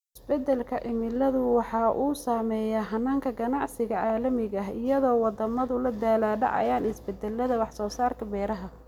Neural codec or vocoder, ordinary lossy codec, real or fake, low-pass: none; none; real; 19.8 kHz